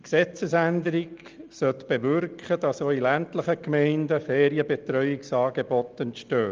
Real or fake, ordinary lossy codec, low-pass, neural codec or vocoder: real; Opus, 24 kbps; 7.2 kHz; none